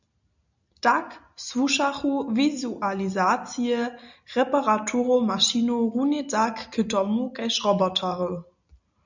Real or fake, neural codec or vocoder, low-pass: real; none; 7.2 kHz